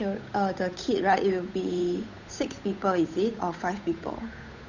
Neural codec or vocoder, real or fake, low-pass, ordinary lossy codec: codec, 16 kHz, 8 kbps, FunCodec, trained on Chinese and English, 25 frames a second; fake; 7.2 kHz; none